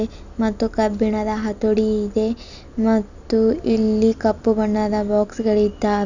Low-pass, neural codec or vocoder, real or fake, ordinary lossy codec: 7.2 kHz; none; real; none